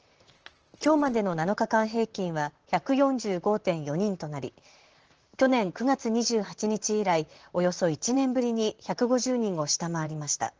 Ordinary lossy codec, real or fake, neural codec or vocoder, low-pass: Opus, 16 kbps; fake; vocoder, 44.1 kHz, 128 mel bands, Pupu-Vocoder; 7.2 kHz